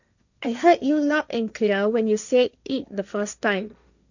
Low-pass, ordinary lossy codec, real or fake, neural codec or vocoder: 7.2 kHz; none; fake; codec, 16 kHz, 1.1 kbps, Voila-Tokenizer